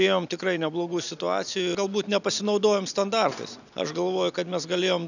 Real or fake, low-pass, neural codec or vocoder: real; 7.2 kHz; none